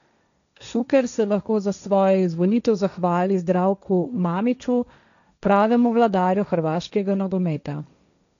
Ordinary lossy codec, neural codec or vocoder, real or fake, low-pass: none; codec, 16 kHz, 1.1 kbps, Voila-Tokenizer; fake; 7.2 kHz